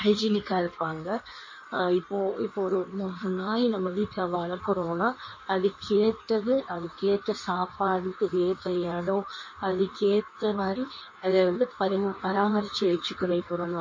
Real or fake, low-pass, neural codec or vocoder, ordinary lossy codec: fake; 7.2 kHz; codec, 16 kHz in and 24 kHz out, 1.1 kbps, FireRedTTS-2 codec; MP3, 32 kbps